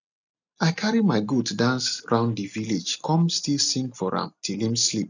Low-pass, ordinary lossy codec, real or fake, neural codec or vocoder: 7.2 kHz; none; real; none